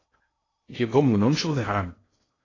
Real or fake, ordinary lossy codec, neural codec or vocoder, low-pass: fake; AAC, 32 kbps; codec, 16 kHz in and 24 kHz out, 0.6 kbps, FocalCodec, streaming, 2048 codes; 7.2 kHz